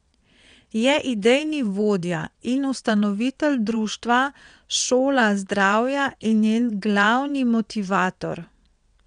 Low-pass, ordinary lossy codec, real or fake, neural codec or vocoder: 9.9 kHz; none; fake; vocoder, 22.05 kHz, 80 mel bands, WaveNeXt